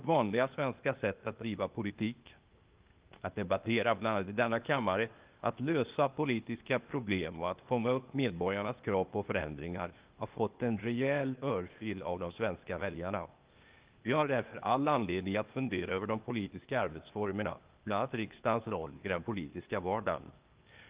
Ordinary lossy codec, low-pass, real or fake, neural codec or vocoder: Opus, 32 kbps; 3.6 kHz; fake; codec, 16 kHz, 0.8 kbps, ZipCodec